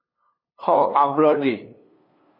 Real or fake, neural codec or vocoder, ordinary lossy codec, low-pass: fake; codec, 16 kHz, 2 kbps, FunCodec, trained on LibriTTS, 25 frames a second; MP3, 24 kbps; 5.4 kHz